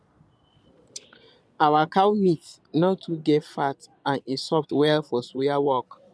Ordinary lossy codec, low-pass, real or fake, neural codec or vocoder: none; none; fake; vocoder, 22.05 kHz, 80 mel bands, Vocos